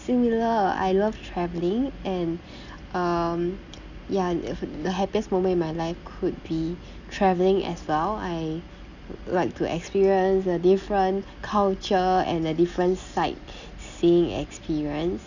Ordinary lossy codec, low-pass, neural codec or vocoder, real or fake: none; 7.2 kHz; none; real